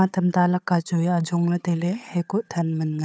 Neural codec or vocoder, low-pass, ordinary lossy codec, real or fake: codec, 16 kHz, 8 kbps, FunCodec, trained on Chinese and English, 25 frames a second; none; none; fake